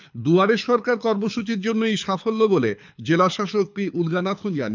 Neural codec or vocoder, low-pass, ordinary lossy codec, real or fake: codec, 24 kHz, 6 kbps, HILCodec; 7.2 kHz; MP3, 64 kbps; fake